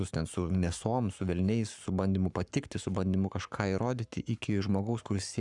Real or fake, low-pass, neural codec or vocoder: fake; 10.8 kHz; codec, 44.1 kHz, 7.8 kbps, Pupu-Codec